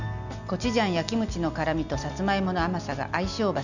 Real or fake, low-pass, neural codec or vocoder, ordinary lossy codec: real; 7.2 kHz; none; none